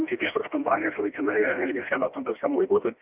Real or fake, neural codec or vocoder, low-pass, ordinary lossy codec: fake; codec, 16 kHz, 1 kbps, FreqCodec, smaller model; 3.6 kHz; Opus, 24 kbps